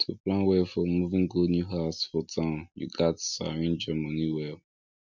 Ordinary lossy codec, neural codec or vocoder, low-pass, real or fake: none; none; 7.2 kHz; real